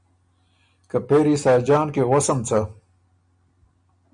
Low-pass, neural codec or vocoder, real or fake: 9.9 kHz; none; real